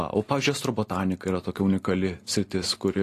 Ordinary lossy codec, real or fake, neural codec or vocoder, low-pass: AAC, 48 kbps; real; none; 14.4 kHz